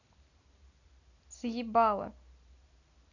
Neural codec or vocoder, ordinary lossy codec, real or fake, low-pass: none; none; real; 7.2 kHz